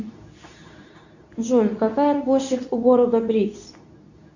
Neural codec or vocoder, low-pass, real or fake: codec, 24 kHz, 0.9 kbps, WavTokenizer, medium speech release version 2; 7.2 kHz; fake